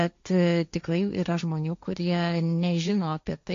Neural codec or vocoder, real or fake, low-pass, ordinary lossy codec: codec, 16 kHz, 1.1 kbps, Voila-Tokenizer; fake; 7.2 kHz; AAC, 64 kbps